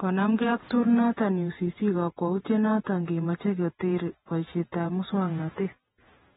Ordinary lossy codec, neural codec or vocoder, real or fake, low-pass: AAC, 16 kbps; vocoder, 48 kHz, 128 mel bands, Vocos; fake; 19.8 kHz